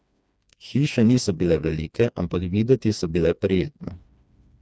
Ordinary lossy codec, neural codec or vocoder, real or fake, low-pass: none; codec, 16 kHz, 2 kbps, FreqCodec, smaller model; fake; none